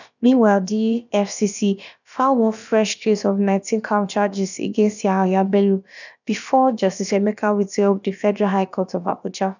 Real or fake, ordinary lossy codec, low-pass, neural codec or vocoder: fake; none; 7.2 kHz; codec, 16 kHz, about 1 kbps, DyCAST, with the encoder's durations